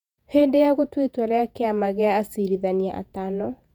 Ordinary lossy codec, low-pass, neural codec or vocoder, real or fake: none; 19.8 kHz; vocoder, 48 kHz, 128 mel bands, Vocos; fake